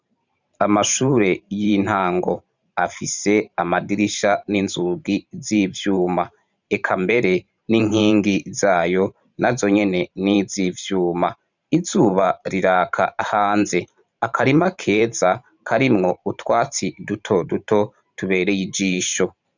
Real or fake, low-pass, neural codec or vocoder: fake; 7.2 kHz; vocoder, 44.1 kHz, 128 mel bands every 512 samples, BigVGAN v2